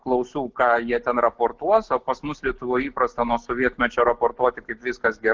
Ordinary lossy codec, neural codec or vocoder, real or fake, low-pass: Opus, 16 kbps; none; real; 7.2 kHz